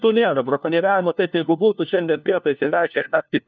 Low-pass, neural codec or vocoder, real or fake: 7.2 kHz; codec, 16 kHz, 1 kbps, FunCodec, trained on LibriTTS, 50 frames a second; fake